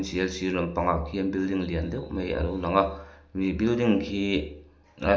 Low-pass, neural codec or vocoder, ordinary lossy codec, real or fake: none; none; none; real